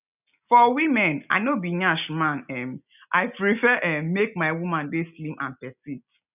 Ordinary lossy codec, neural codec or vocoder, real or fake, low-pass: none; none; real; 3.6 kHz